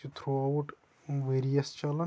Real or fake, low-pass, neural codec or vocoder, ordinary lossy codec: real; none; none; none